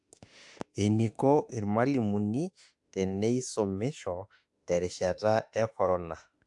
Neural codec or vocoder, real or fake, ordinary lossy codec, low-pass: autoencoder, 48 kHz, 32 numbers a frame, DAC-VAE, trained on Japanese speech; fake; AAC, 64 kbps; 10.8 kHz